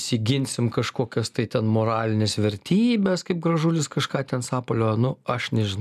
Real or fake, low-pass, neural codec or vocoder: real; 14.4 kHz; none